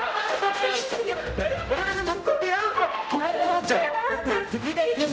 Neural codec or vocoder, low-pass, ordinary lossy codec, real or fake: codec, 16 kHz, 0.5 kbps, X-Codec, HuBERT features, trained on general audio; none; none; fake